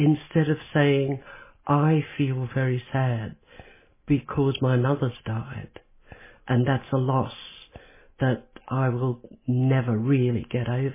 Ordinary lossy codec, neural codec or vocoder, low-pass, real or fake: MP3, 16 kbps; none; 3.6 kHz; real